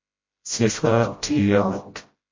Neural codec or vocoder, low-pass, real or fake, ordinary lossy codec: codec, 16 kHz, 0.5 kbps, FreqCodec, smaller model; 7.2 kHz; fake; MP3, 32 kbps